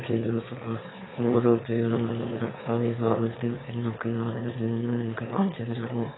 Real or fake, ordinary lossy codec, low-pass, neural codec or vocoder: fake; AAC, 16 kbps; 7.2 kHz; autoencoder, 22.05 kHz, a latent of 192 numbers a frame, VITS, trained on one speaker